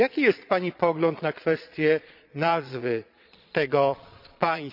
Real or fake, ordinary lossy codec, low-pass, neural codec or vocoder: fake; none; 5.4 kHz; vocoder, 22.05 kHz, 80 mel bands, Vocos